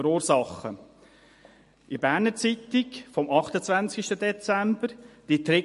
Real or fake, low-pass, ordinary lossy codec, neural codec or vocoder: real; 14.4 kHz; MP3, 48 kbps; none